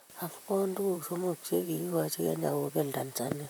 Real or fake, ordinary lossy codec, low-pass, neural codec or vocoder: fake; none; none; vocoder, 44.1 kHz, 128 mel bands, Pupu-Vocoder